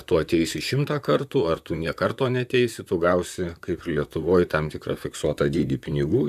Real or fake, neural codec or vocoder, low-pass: fake; vocoder, 44.1 kHz, 128 mel bands, Pupu-Vocoder; 14.4 kHz